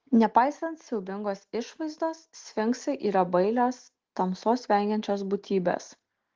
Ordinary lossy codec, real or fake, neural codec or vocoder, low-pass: Opus, 16 kbps; real; none; 7.2 kHz